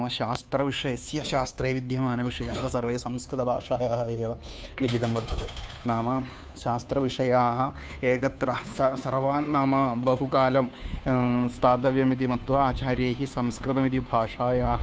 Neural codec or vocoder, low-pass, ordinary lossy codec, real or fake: codec, 16 kHz, 2 kbps, FunCodec, trained on Chinese and English, 25 frames a second; none; none; fake